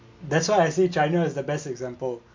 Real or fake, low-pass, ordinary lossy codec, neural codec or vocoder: real; 7.2 kHz; MP3, 48 kbps; none